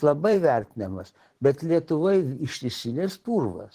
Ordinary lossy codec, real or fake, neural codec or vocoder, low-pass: Opus, 16 kbps; fake; vocoder, 44.1 kHz, 128 mel bands every 512 samples, BigVGAN v2; 14.4 kHz